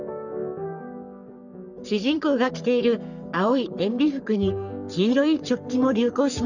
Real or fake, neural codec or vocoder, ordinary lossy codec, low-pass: fake; codec, 44.1 kHz, 3.4 kbps, Pupu-Codec; none; 7.2 kHz